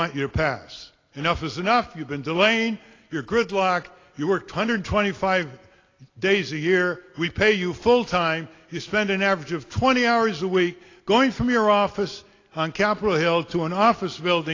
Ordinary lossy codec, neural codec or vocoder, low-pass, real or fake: AAC, 32 kbps; none; 7.2 kHz; real